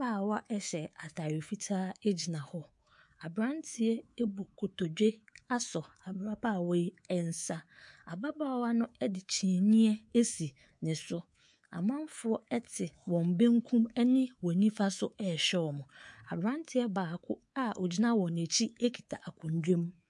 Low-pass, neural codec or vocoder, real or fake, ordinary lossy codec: 10.8 kHz; codec, 24 kHz, 3.1 kbps, DualCodec; fake; MP3, 64 kbps